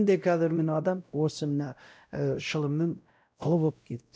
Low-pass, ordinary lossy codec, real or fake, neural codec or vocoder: none; none; fake; codec, 16 kHz, 0.5 kbps, X-Codec, WavLM features, trained on Multilingual LibriSpeech